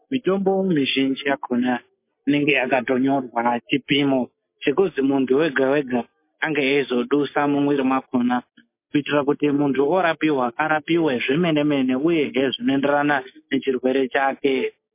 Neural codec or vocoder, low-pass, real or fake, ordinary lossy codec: none; 3.6 kHz; real; MP3, 24 kbps